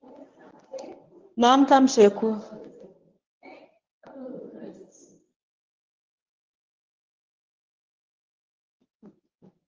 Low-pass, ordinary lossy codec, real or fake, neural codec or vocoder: 7.2 kHz; Opus, 32 kbps; fake; codec, 24 kHz, 0.9 kbps, WavTokenizer, medium speech release version 1